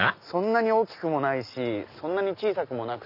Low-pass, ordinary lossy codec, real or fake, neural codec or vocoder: 5.4 kHz; none; real; none